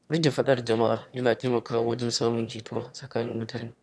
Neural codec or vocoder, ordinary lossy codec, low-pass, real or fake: autoencoder, 22.05 kHz, a latent of 192 numbers a frame, VITS, trained on one speaker; none; none; fake